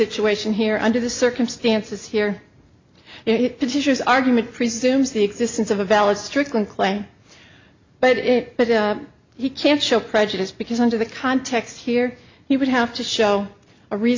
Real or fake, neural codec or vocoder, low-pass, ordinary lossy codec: real; none; 7.2 kHz; MP3, 64 kbps